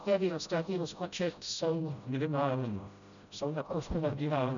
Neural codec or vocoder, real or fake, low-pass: codec, 16 kHz, 0.5 kbps, FreqCodec, smaller model; fake; 7.2 kHz